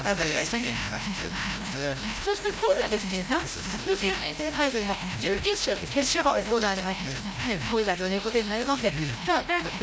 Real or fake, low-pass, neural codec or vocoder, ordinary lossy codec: fake; none; codec, 16 kHz, 0.5 kbps, FreqCodec, larger model; none